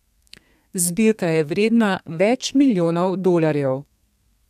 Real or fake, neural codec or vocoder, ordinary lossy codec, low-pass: fake; codec, 32 kHz, 1.9 kbps, SNAC; none; 14.4 kHz